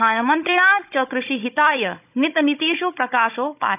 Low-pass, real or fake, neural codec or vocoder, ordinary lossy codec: 3.6 kHz; fake; codec, 16 kHz, 16 kbps, FunCodec, trained on Chinese and English, 50 frames a second; none